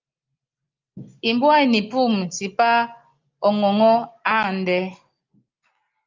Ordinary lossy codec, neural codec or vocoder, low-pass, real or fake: Opus, 24 kbps; none; 7.2 kHz; real